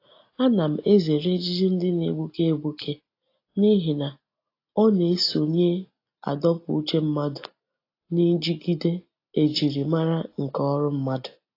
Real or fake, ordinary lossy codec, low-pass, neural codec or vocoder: real; AAC, 32 kbps; 5.4 kHz; none